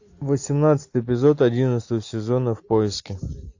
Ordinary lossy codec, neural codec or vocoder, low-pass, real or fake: AAC, 48 kbps; none; 7.2 kHz; real